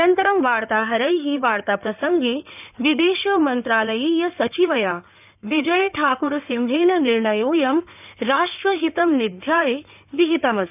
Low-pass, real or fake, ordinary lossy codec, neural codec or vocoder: 3.6 kHz; fake; none; codec, 16 kHz in and 24 kHz out, 2.2 kbps, FireRedTTS-2 codec